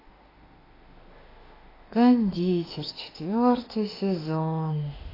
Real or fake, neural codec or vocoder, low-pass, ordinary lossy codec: fake; autoencoder, 48 kHz, 32 numbers a frame, DAC-VAE, trained on Japanese speech; 5.4 kHz; AAC, 24 kbps